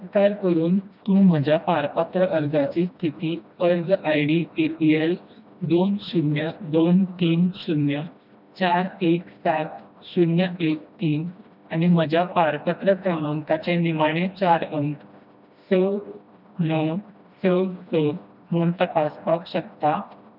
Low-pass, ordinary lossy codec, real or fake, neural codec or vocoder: 5.4 kHz; none; fake; codec, 16 kHz, 1 kbps, FreqCodec, smaller model